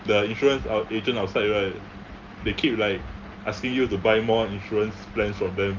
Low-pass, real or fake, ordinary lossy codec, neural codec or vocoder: 7.2 kHz; real; Opus, 32 kbps; none